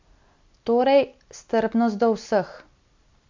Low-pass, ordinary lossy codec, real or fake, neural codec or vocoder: 7.2 kHz; MP3, 64 kbps; real; none